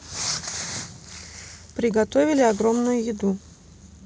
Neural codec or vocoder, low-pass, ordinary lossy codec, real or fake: none; none; none; real